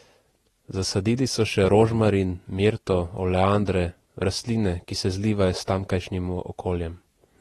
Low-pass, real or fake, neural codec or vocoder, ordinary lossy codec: 19.8 kHz; fake; vocoder, 48 kHz, 128 mel bands, Vocos; AAC, 32 kbps